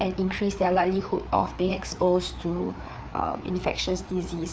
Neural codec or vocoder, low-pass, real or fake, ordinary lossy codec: codec, 16 kHz, 4 kbps, FreqCodec, larger model; none; fake; none